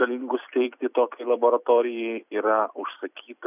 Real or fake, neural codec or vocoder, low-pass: fake; vocoder, 44.1 kHz, 128 mel bands every 256 samples, BigVGAN v2; 3.6 kHz